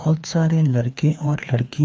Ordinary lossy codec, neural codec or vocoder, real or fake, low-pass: none; codec, 16 kHz, 2 kbps, FreqCodec, larger model; fake; none